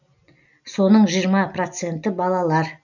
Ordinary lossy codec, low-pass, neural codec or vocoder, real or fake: none; 7.2 kHz; none; real